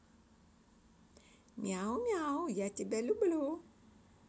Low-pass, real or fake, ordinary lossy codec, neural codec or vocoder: none; real; none; none